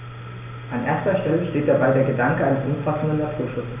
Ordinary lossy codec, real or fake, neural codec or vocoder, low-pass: none; real; none; 3.6 kHz